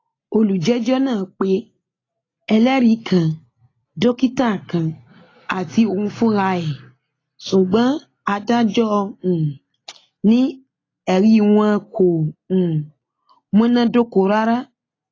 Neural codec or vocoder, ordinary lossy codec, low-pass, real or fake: none; AAC, 32 kbps; 7.2 kHz; real